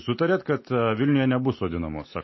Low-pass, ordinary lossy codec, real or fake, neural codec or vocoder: 7.2 kHz; MP3, 24 kbps; real; none